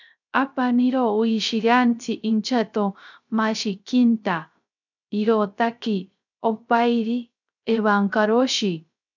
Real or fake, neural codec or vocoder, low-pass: fake; codec, 16 kHz, 0.3 kbps, FocalCodec; 7.2 kHz